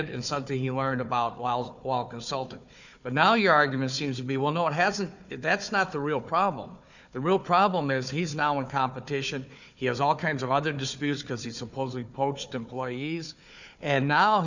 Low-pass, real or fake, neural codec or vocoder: 7.2 kHz; fake; codec, 16 kHz, 4 kbps, FunCodec, trained on Chinese and English, 50 frames a second